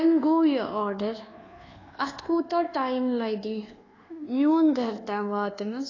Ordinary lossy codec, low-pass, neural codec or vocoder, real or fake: AAC, 32 kbps; 7.2 kHz; codec, 24 kHz, 1.2 kbps, DualCodec; fake